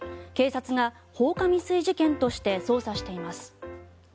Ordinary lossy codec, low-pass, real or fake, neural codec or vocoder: none; none; real; none